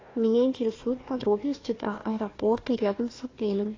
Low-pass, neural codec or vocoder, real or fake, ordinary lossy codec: 7.2 kHz; codec, 16 kHz, 1 kbps, FunCodec, trained on Chinese and English, 50 frames a second; fake; AAC, 32 kbps